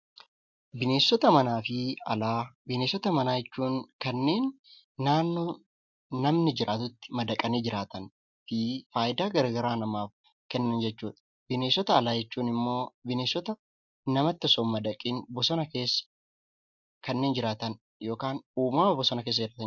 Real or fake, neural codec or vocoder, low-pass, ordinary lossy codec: real; none; 7.2 kHz; MP3, 64 kbps